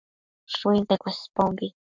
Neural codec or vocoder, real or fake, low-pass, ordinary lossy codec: codec, 44.1 kHz, 7.8 kbps, Pupu-Codec; fake; 7.2 kHz; MP3, 64 kbps